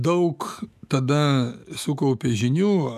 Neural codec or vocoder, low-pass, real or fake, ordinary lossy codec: autoencoder, 48 kHz, 128 numbers a frame, DAC-VAE, trained on Japanese speech; 14.4 kHz; fake; MP3, 96 kbps